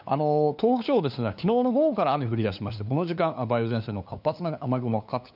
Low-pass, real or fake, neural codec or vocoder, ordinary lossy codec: 5.4 kHz; fake; codec, 16 kHz, 2 kbps, FunCodec, trained on LibriTTS, 25 frames a second; none